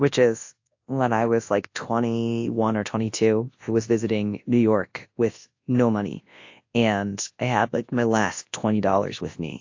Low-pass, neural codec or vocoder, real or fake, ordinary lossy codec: 7.2 kHz; codec, 24 kHz, 0.9 kbps, WavTokenizer, large speech release; fake; AAC, 48 kbps